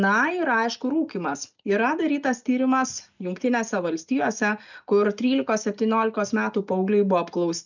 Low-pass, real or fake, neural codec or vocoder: 7.2 kHz; real; none